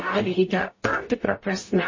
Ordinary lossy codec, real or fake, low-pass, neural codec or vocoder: MP3, 32 kbps; fake; 7.2 kHz; codec, 44.1 kHz, 0.9 kbps, DAC